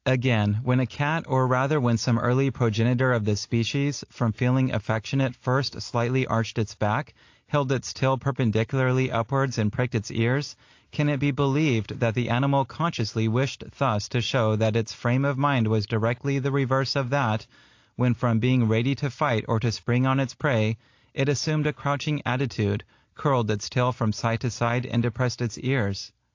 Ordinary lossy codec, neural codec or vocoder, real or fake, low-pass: AAC, 48 kbps; none; real; 7.2 kHz